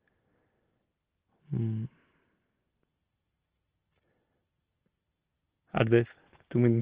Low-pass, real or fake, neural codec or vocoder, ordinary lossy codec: 3.6 kHz; real; none; Opus, 16 kbps